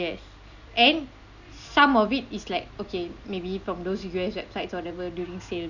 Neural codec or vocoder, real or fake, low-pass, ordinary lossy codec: none; real; 7.2 kHz; none